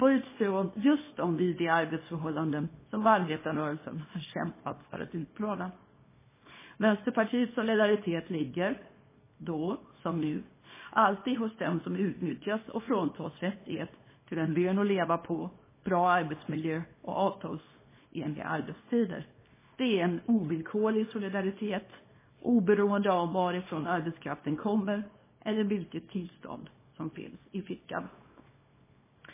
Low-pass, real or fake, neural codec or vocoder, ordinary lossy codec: 3.6 kHz; fake; codec, 24 kHz, 0.9 kbps, WavTokenizer, small release; MP3, 16 kbps